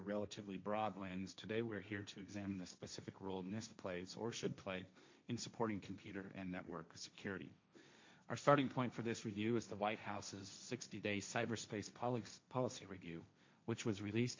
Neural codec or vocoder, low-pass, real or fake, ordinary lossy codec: codec, 16 kHz, 1.1 kbps, Voila-Tokenizer; 7.2 kHz; fake; MP3, 48 kbps